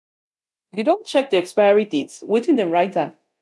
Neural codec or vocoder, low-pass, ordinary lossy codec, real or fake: codec, 24 kHz, 0.9 kbps, DualCodec; none; none; fake